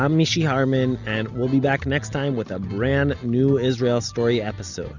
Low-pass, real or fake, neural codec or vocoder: 7.2 kHz; real; none